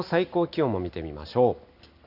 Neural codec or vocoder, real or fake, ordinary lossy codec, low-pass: none; real; none; 5.4 kHz